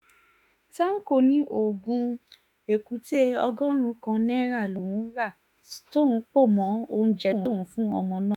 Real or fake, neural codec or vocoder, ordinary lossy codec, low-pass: fake; autoencoder, 48 kHz, 32 numbers a frame, DAC-VAE, trained on Japanese speech; none; 19.8 kHz